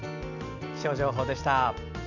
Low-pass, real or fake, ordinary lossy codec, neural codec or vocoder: 7.2 kHz; real; none; none